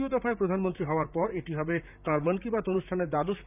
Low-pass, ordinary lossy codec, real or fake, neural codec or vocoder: 3.6 kHz; none; fake; vocoder, 44.1 kHz, 128 mel bands, Pupu-Vocoder